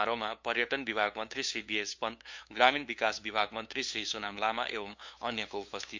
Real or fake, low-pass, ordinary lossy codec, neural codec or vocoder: fake; 7.2 kHz; MP3, 64 kbps; codec, 16 kHz, 2 kbps, FunCodec, trained on LibriTTS, 25 frames a second